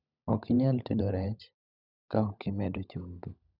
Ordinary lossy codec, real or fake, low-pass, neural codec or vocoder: none; fake; 5.4 kHz; codec, 16 kHz, 16 kbps, FunCodec, trained on LibriTTS, 50 frames a second